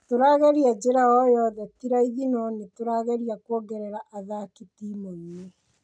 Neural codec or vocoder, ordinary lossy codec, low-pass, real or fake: none; none; 9.9 kHz; real